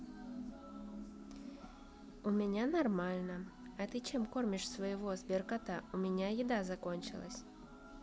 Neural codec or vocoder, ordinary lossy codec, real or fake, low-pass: none; none; real; none